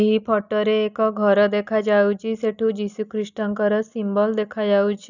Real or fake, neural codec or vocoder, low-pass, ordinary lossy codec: real; none; 7.2 kHz; none